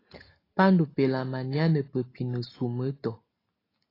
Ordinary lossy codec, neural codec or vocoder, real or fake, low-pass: AAC, 24 kbps; none; real; 5.4 kHz